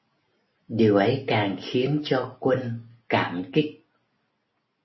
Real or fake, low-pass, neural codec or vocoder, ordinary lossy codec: real; 7.2 kHz; none; MP3, 24 kbps